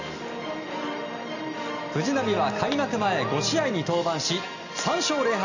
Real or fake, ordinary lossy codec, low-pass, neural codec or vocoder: real; none; 7.2 kHz; none